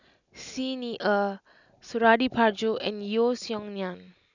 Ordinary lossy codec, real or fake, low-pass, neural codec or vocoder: none; real; 7.2 kHz; none